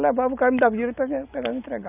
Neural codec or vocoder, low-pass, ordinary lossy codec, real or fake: none; 3.6 kHz; none; real